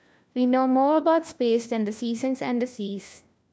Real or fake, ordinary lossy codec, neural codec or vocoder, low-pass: fake; none; codec, 16 kHz, 1 kbps, FunCodec, trained on LibriTTS, 50 frames a second; none